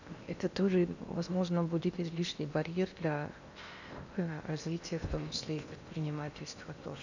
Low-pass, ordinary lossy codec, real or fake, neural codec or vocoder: 7.2 kHz; none; fake; codec, 16 kHz in and 24 kHz out, 0.8 kbps, FocalCodec, streaming, 65536 codes